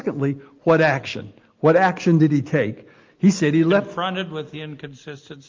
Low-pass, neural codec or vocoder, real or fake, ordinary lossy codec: 7.2 kHz; none; real; Opus, 32 kbps